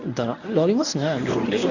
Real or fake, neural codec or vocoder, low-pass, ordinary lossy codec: fake; codec, 24 kHz, 0.9 kbps, WavTokenizer, medium speech release version 1; 7.2 kHz; none